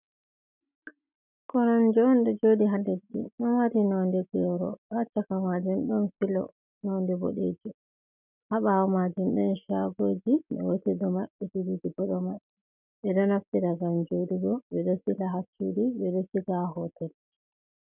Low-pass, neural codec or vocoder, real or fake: 3.6 kHz; none; real